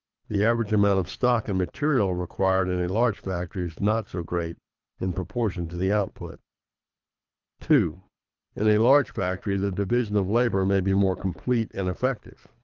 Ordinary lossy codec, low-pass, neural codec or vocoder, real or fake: Opus, 24 kbps; 7.2 kHz; codec, 24 kHz, 3 kbps, HILCodec; fake